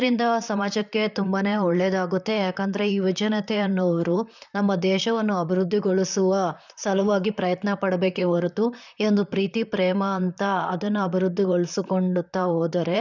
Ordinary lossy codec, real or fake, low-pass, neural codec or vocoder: none; fake; 7.2 kHz; vocoder, 44.1 kHz, 128 mel bands, Pupu-Vocoder